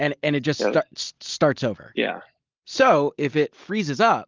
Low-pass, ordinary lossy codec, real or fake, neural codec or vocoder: 7.2 kHz; Opus, 24 kbps; real; none